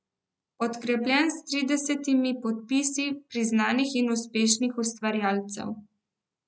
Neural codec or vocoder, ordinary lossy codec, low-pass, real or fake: none; none; none; real